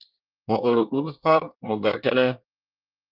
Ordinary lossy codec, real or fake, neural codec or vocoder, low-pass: Opus, 32 kbps; fake; codec, 24 kHz, 1 kbps, SNAC; 5.4 kHz